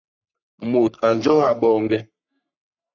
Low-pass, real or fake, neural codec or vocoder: 7.2 kHz; fake; codec, 44.1 kHz, 3.4 kbps, Pupu-Codec